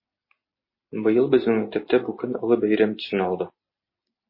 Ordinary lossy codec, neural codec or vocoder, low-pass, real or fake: MP3, 24 kbps; none; 5.4 kHz; real